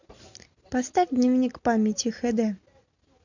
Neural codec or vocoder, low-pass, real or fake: none; 7.2 kHz; real